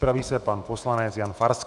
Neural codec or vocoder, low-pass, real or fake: autoencoder, 48 kHz, 128 numbers a frame, DAC-VAE, trained on Japanese speech; 10.8 kHz; fake